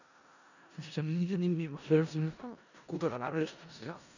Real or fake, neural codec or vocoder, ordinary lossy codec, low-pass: fake; codec, 16 kHz in and 24 kHz out, 0.4 kbps, LongCat-Audio-Codec, four codebook decoder; none; 7.2 kHz